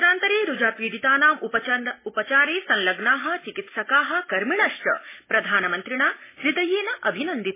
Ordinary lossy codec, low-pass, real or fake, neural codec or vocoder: MP3, 16 kbps; 3.6 kHz; real; none